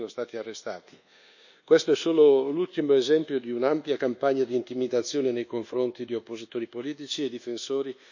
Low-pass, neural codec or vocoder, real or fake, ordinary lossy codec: 7.2 kHz; codec, 24 kHz, 1.2 kbps, DualCodec; fake; none